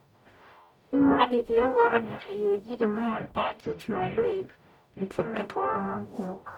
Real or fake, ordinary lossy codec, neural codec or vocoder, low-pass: fake; none; codec, 44.1 kHz, 0.9 kbps, DAC; 19.8 kHz